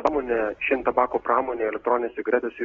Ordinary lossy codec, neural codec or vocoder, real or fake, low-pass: AAC, 24 kbps; none; real; 7.2 kHz